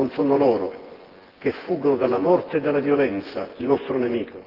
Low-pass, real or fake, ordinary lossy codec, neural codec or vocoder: 5.4 kHz; fake; Opus, 16 kbps; vocoder, 24 kHz, 100 mel bands, Vocos